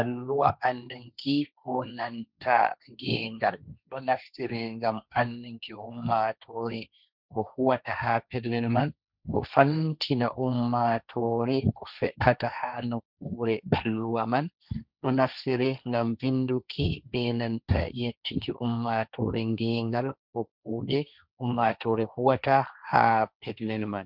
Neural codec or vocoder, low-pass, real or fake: codec, 16 kHz, 1.1 kbps, Voila-Tokenizer; 5.4 kHz; fake